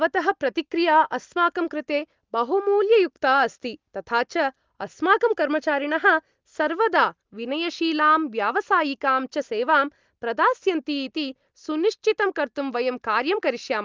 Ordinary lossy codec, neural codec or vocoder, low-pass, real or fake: Opus, 32 kbps; none; 7.2 kHz; real